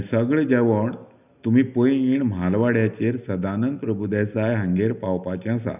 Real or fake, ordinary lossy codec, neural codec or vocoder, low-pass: real; none; none; 3.6 kHz